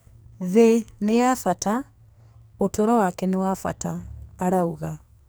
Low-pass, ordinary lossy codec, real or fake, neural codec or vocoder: none; none; fake; codec, 44.1 kHz, 2.6 kbps, SNAC